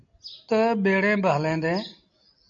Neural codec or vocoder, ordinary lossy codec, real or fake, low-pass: none; MP3, 64 kbps; real; 7.2 kHz